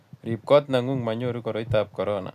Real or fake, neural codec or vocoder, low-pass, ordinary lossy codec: real; none; 14.4 kHz; none